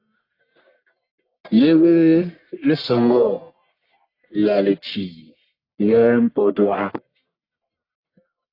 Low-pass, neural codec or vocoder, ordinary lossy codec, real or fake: 5.4 kHz; codec, 44.1 kHz, 1.7 kbps, Pupu-Codec; AAC, 32 kbps; fake